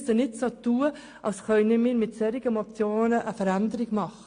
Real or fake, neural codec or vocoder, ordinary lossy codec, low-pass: real; none; AAC, 48 kbps; 9.9 kHz